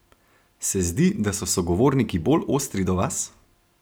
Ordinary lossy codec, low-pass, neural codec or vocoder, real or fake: none; none; none; real